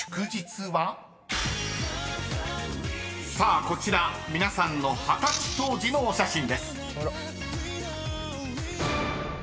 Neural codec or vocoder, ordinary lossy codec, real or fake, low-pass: none; none; real; none